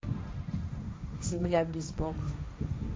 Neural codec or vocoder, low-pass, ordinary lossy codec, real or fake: codec, 16 kHz, 1.1 kbps, Voila-Tokenizer; none; none; fake